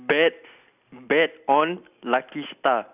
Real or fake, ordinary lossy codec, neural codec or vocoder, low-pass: real; none; none; 3.6 kHz